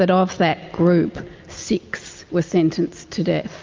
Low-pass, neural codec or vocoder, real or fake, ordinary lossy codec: 7.2 kHz; none; real; Opus, 24 kbps